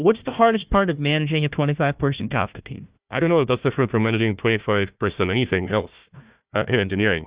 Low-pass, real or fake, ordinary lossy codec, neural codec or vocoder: 3.6 kHz; fake; Opus, 64 kbps; codec, 16 kHz, 1 kbps, FunCodec, trained on Chinese and English, 50 frames a second